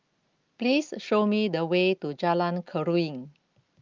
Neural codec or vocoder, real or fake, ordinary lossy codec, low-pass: none; real; Opus, 32 kbps; 7.2 kHz